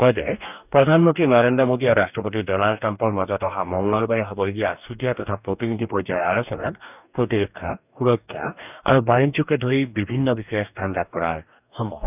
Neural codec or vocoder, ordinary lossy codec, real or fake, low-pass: codec, 44.1 kHz, 2.6 kbps, DAC; none; fake; 3.6 kHz